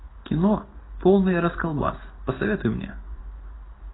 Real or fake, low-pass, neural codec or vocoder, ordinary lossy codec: real; 7.2 kHz; none; AAC, 16 kbps